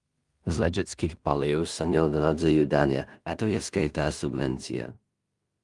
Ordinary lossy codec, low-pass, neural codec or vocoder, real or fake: Opus, 32 kbps; 10.8 kHz; codec, 16 kHz in and 24 kHz out, 0.4 kbps, LongCat-Audio-Codec, two codebook decoder; fake